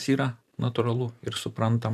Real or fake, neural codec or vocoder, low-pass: fake; vocoder, 44.1 kHz, 128 mel bands every 512 samples, BigVGAN v2; 14.4 kHz